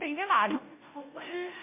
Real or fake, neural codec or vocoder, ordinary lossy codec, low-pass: fake; codec, 16 kHz, 0.5 kbps, FunCodec, trained on Chinese and English, 25 frames a second; MP3, 24 kbps; 3.6 kHz